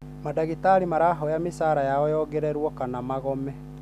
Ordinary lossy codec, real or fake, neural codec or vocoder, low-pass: none; real; none; 14.4 kHz